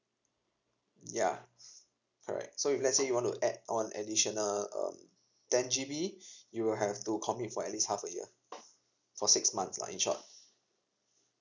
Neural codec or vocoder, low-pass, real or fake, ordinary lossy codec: none; 7.2 kHz; real; none